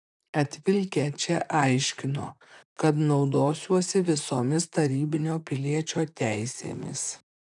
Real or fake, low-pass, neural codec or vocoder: fake; 10.8 kHz; vocoder, 44.1 kHz, 128 mel bands, Pupu-Vocoder